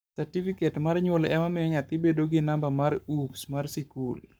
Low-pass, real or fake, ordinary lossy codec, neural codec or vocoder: none; fake; none; codec, 44.1 kHz, 7.8 kbps, Pupu-Codec